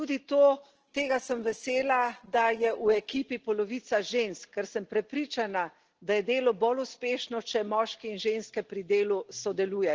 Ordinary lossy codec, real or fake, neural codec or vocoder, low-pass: Opus, 16 kbps; real; none; 7.2 kHz